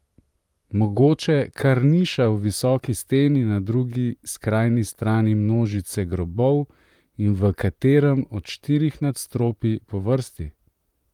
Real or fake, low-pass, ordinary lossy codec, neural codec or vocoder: fake; 19.8 kHz; Opus, 32 kbps; vocoder, 44.1 kHz, 128 mel bands, Pupu-Vocoder